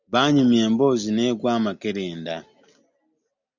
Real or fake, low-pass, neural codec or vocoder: real; 7.2 kHz; none